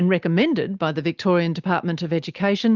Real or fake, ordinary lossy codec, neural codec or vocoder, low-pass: real; Opus, 24 kbps; none; 7.2 kHz